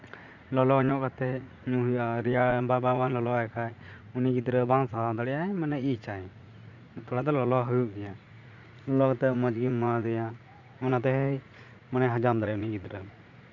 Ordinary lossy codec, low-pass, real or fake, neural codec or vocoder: none; 7.2 kHz; fake; vocoder, 44.1 kHz, 80 mel bands, Vocos